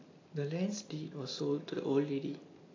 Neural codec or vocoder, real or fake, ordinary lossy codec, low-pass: none; real; AAC, 32 kbps; 7.2 kHz